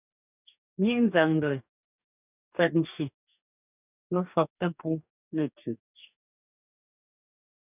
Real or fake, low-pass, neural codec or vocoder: fake; 3.6 kHz; codec, 44.1 kHz, 2.6 kbps, DAC